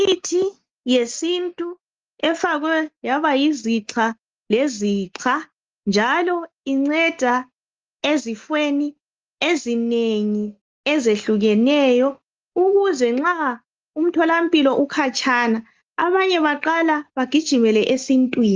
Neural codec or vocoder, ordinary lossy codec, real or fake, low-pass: none; Opus, 32 kbps; real; 7.2 kHz